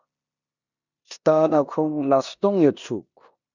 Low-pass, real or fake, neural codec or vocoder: 7.2 kHz; fake; codec, 16 kHz in and 24 kHz out, 0.9 kbps, LongCat-Audio-Codec, four codebook decoder